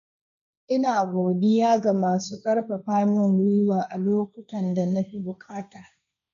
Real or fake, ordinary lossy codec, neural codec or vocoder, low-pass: fake; none; codec, 16 kHz, 1.1 kbps, Voila-Tokenizer; 7.2 kHz